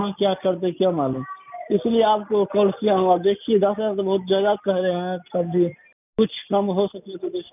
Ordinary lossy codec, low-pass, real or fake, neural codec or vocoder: none; 3.6 kHz; real; none